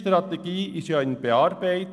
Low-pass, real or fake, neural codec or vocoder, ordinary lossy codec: none; real; none; none